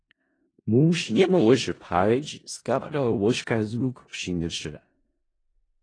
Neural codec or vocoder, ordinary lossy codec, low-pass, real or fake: codec, 16 kHz in and 24 kHz out, 0.4 kbps, LongCat-Audio-Codec, four codebook decoder; AAC, 32 kbps; 9.9 kHz; fake